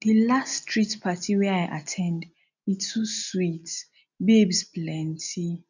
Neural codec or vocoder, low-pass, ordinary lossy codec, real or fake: none; 7.2 kHz; none; real